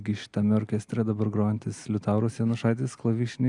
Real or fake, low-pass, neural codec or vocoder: real; 10.8 kHz; none